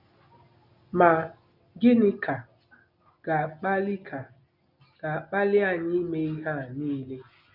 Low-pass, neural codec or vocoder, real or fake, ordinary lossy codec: 5.4 kHz; none; real; none